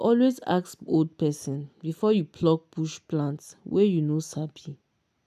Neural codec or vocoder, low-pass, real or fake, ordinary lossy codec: none; 14.4 kHz; real; none